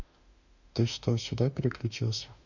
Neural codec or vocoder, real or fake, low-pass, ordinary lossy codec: autoencoder, 48 kHz, 32 numbers a frame, DAC-VAE, trained on Japanese speech; fake; 7.2 kHz; none